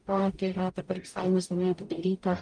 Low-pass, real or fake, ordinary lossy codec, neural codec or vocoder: 9.9 kHz; fake; Opus, 32 kbps; codec, 44.1 kHz, 0.9 kbps, DAC